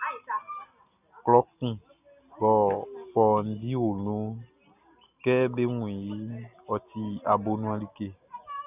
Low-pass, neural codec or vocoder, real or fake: 3.6 kHz; none; real